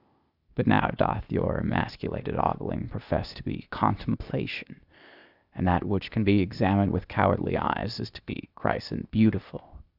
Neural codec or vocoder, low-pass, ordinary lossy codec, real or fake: codec, 16 kHz, 0.8 kbps, ZipCodec; 5.4 kHz; Opus, 64 kbps; fake